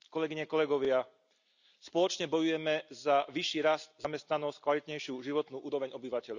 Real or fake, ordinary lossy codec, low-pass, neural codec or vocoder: real; none; 7.2 kHz; none